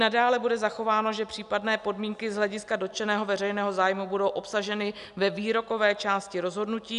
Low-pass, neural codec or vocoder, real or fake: 10.8 kHz; none; real